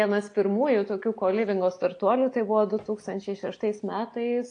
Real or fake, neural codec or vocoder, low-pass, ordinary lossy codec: real; none; 9.9 kHz; AAC, 48 kbps